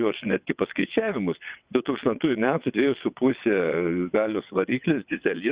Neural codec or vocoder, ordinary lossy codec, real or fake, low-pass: vocoder, 22.05 kHz, 80 mel bands, WaveNeXt; Opus, 64 kbps; fake; 3.6 kHz